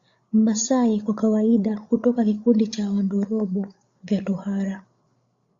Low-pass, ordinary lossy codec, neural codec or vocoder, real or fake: 7.2 kHz; Opus, 64 kbps; codec, 16 kHz, 8 kbps, FreqCodec, larger model; fake